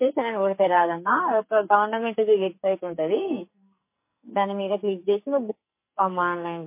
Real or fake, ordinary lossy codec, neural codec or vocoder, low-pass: fake; MP3, 24 kbps; codec, 44.1 kHz, 2.6 kbps, SNAC; 3.6 kHz